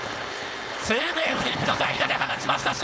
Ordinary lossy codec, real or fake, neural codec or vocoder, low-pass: none; fake; codec, 16 kHz, 4.8 kbps, FACodec; none